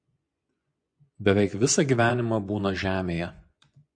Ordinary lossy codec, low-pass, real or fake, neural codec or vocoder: AAC, 64 kbps; 9.9 kHz; fake; vocoder, 24 kHz, 100 mel bands, Vocos